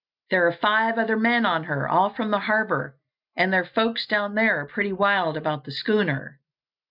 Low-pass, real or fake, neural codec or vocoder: 5.4 kHz; real; none